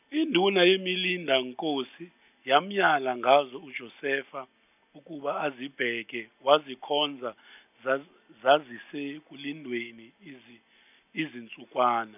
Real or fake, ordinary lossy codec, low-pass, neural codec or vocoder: real; none; 3.6 kHz; none